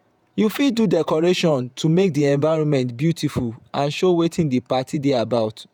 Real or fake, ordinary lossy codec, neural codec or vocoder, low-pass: fake; none; vocoder, 48 kHz, 128 mel bands, Vocos; 19.8 kHz